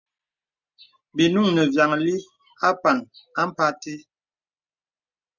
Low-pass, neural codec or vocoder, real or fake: 7.2 kHz; none; real